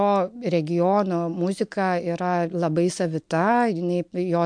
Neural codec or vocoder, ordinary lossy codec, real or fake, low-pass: none; MP3, 64 kbps; real; 9.9 kHz